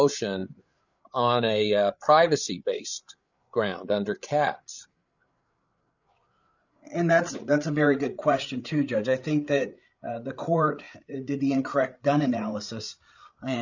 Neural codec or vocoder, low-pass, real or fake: codec, 16 kHz, 8 kbps, FreqCodec, larger model; 7.2 kHz; fake